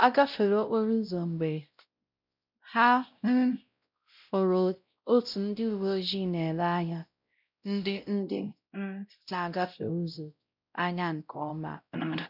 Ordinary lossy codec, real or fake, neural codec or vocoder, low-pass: none; fake; codec, 16 kHz, 0.5 kbps, X-Codec, WavLM features, trained on Multilingual LibriSpeech; 5.4 kHz